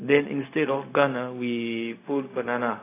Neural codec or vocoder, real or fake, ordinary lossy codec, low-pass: codec, 16 kHz, 0.4 kbps, LongCat-Audio-Codec; fake; AAC, 24 kbps; 3.6 kHz